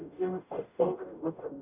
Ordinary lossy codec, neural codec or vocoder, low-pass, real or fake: none; codec, 44.1 kHz, 0.9 kbps, DAC; 3.6 kHz; fake